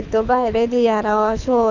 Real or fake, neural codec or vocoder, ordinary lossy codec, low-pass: fake; codec, 24 kHz, 6 kbps, HILCodec; none; 7.2 kHz